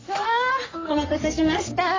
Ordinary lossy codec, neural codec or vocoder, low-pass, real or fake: AAC, 32 kbps; codec, 44.1 kHz, 3.4 kbps, Pupu-Codec; 7.2 kHz; fake